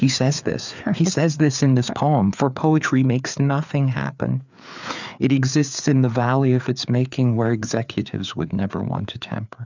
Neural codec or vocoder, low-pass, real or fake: codec, 16 kHz, 4 kbps, FreqCodec, larger model; 7.2 kHz; fake